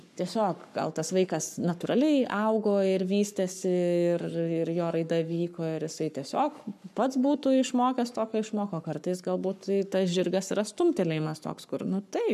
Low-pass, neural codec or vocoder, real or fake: 14.4 kHz; codec, 44.1 kHz, 7.8 kbps, Pupu-Codec; fake